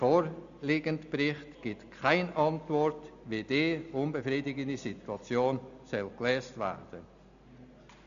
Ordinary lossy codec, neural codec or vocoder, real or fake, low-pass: AAC, 96 kbps; none; real; 7.2 kHz